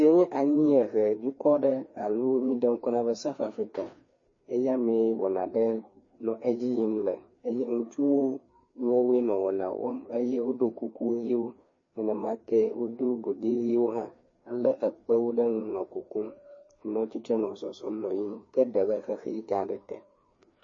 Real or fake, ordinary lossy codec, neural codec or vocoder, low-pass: fake; MP3, 32 kbps; codec, 16 kHz, 2 kbps, FreqCodec, larger model; 7.2 kHz